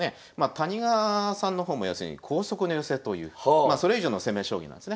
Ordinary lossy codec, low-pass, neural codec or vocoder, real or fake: none; none; none; real